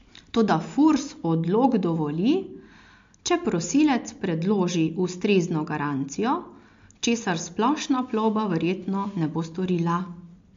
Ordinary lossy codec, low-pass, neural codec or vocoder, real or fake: MP3, 48 kbps; 7.2 kHz; none; real